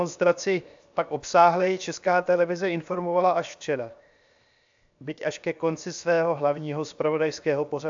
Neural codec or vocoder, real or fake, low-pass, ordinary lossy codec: codec, 16 kHz, 0.7 kbps, FocalCodec; fake; 7.2 kHz; AAC, 64 kbps